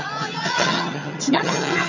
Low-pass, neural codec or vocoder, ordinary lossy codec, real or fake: 7.2 kHz; vocoder, 22.05 kHz, 80 mel bands, HiFi-GAN; none; fake